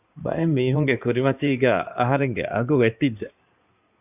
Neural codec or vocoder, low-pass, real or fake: codec, 16 kHz in and 24 kHz out, 2.2 kbps, FireRedTTS-2 codec; 3.6 kHz; fake